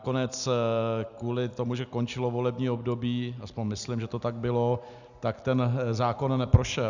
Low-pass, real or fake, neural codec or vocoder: 7.2 kHz; real; none